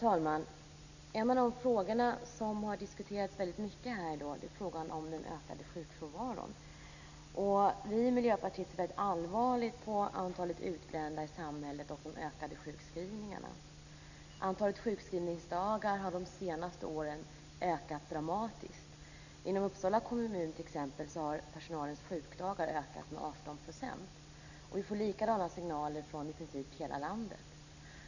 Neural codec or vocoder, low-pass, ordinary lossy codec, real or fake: none; 7.2 kHz; none; real